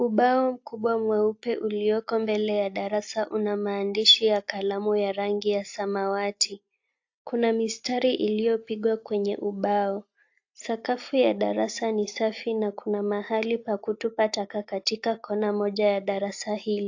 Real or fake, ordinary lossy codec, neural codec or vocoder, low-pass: real; AAC, 48 kbps; none; 7.2 kHz